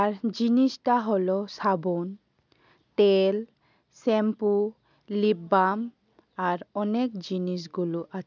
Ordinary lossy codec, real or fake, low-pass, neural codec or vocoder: none; real; 7.2 kHz; none